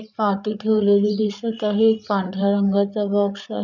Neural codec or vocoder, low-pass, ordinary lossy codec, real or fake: codec, 16 kHz, 8 kbps, FreqCodec, larger model; 7.2 kHz; none; fake